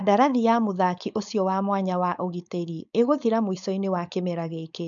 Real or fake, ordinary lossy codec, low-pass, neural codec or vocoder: fake; none; 7.2 kHz; codec, 16 kHz, 4.8 kbps, FACodec